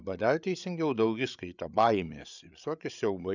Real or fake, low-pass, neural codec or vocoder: fake; 7.2 kHz; codec, 16 kHz, 8 kbps, FreqCodec, larger model